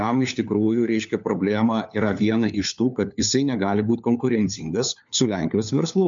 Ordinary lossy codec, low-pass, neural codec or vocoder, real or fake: MP3, 64 kbps; 7.2 kHz; codec, 16 kHz, 4 kbps, FunCodec, trained on LibriTTS, 50 frames a second; fake